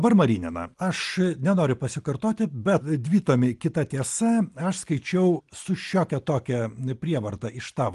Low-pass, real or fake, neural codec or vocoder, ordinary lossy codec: 10.8 kHz; real; none; Opus, 24 kbps